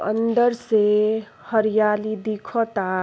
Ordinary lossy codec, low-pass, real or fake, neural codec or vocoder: none; none; real; none